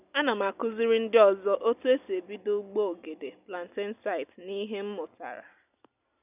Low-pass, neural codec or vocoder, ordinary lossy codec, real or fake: 3.6 kHz; none; none; real